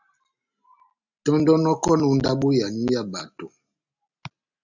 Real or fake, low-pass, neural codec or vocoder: real; 7.2 kHz; none